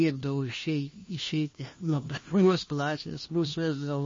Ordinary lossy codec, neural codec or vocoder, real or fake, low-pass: MP3, 32 kbps; codec, 16 kHz, 1 kbps, FunCodec, trained on LibriTTS, 50 frames a second; fake; 7.2 kHz